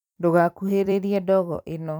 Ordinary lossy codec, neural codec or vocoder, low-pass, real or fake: none; vocoder, 44.1 kHz, 128 mel bands every 256 samples, BigVGAN v2; 19.8 kHz; fake